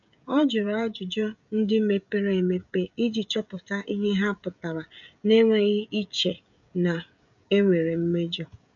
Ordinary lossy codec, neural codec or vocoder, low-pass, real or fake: none; codec, 16 kHz, 16 kbps, FreqCodec, smaller model; 7.2 kHz; fake